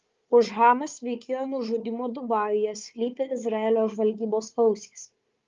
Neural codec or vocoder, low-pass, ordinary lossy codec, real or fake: codec, 16 kHz, 4 kbps, FunCodec, trained on Chinese and English, 50 frames a second; 7.2 kHz; Opus, 32 kbps; fake